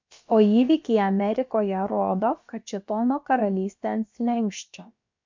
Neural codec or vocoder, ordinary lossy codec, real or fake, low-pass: codec, 16 kHz, about 1 kbps, DyCAST, with the encoder's durations; MP3, 48 kbps; fake; 7.2 kHz